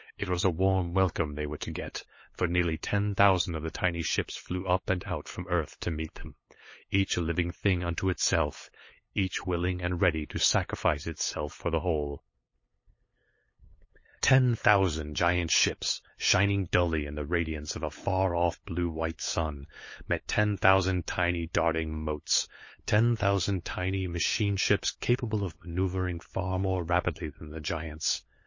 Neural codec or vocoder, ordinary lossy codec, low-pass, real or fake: codec, 16 kHz, 8 kbps, FunCodec, trained on LibriTTS, 25 frames a second; MP3, 32 kbps; 7.2 kHz; fake